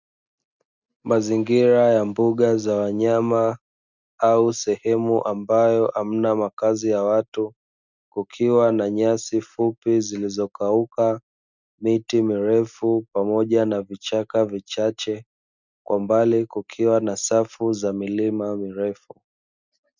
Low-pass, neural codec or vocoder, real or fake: 7.2 kHz; none; real